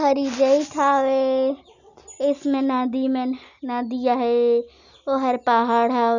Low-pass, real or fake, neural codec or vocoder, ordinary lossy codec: 7.2 kHz; real; none; none